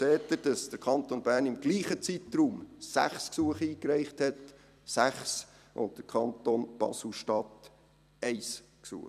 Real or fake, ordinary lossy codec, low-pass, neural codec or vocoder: fake; none; 14.4 kHz; vocoder, 44.1 kHz, 128 mel bands every 256 samples, BigVGAN v2